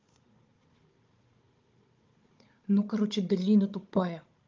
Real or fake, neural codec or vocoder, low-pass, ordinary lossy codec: fake; codec, 16 kHz, 16 kbps, FunCodec, trained on Chinese and English, 50 frames a second; 7.2 kHz; Opus, 32 kbps